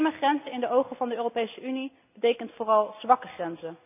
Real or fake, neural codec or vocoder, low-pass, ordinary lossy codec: real; none; 3.6 kHz; none